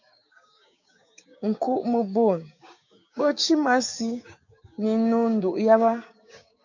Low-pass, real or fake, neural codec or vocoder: 7.2 kHz; fake; codec, 16 kHz, 6 kbps, DAC